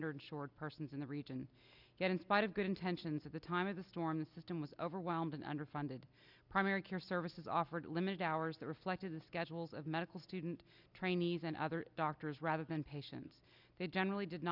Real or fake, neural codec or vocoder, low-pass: real; none; 5.4 kHz